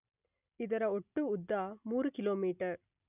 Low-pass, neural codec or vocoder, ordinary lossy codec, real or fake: 3.6 kHz; none; none; real